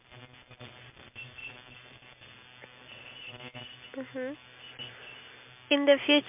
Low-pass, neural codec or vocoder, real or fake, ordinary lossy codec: 3.6 kHz; none; real; MP3, 32 kbps